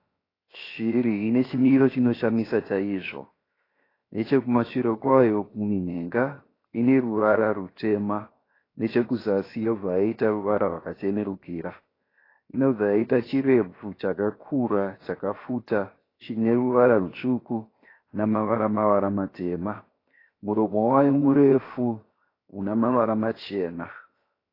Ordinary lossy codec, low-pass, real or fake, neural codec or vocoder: AAC, 24 kbps; 5.4 kHz; fake; codec, 16 kHz, 0.7 kbps, FocalCodec